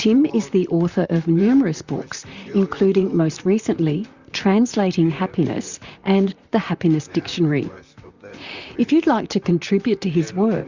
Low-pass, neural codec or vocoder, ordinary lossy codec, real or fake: 7.2 kHz; vocoder, 44.1 kHz, 128 mel bands, Pupu-Vocoder; Opus, 64 kbps; fake